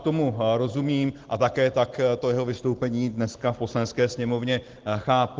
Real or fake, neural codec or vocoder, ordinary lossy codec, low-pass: real; none; Opus, 16 kbps; 7.2 kHz